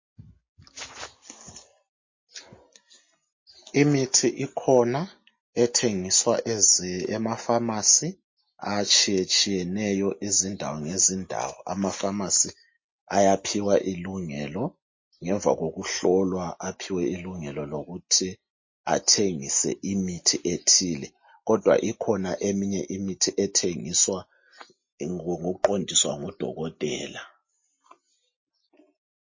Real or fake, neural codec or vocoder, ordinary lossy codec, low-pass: real; none; MP3, 32 kbps; 7.2 kHz